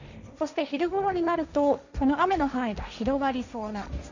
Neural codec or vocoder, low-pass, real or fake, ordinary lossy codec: codec, 16 kHz, 1.1 kbps, Voila-Tokenizer; 7.2 kHz; fake; none